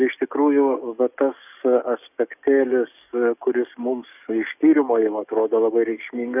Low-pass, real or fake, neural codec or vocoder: 3.6 kHz; fake; codec, 44.1 kHz, 7.8 kbps, DAC